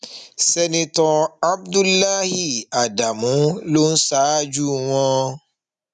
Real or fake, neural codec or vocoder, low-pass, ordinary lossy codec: real; none; 9.9 kHz; none